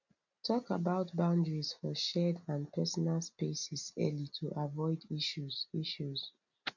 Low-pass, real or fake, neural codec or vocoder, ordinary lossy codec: 7.2 kHz; real; none; none